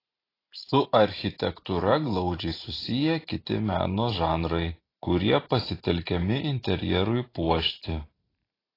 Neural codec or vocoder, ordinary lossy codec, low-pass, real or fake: vocoder, 44.1 kHz, 128 mel bands every 512 samples, BigVGAN v2; AAC, 24 kbps; 5.4 kHz; fake